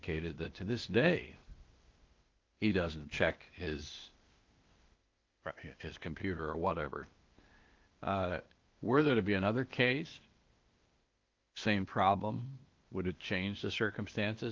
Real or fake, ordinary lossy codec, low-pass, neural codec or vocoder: fake; Opus, 32 kbps; 7.2 kHz; codec, 16 kHz, 0.8 kbps, ZipCodec